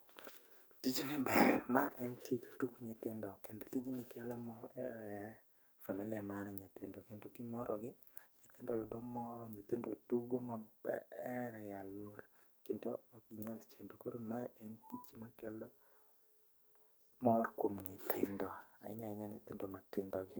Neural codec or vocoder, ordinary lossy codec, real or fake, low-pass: codec, 44.1 kHz, 2.6 kbps, SNAC; none; fake; none